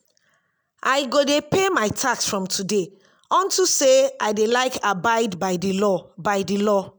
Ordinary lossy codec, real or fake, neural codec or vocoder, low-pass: none; real; none; none